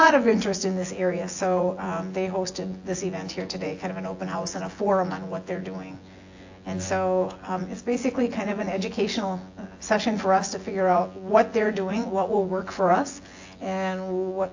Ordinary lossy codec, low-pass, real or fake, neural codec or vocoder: AAC, 48 kbps; 7.2 kHz; fake; vocoder, 24 kHz, 100 mel bands, Vocos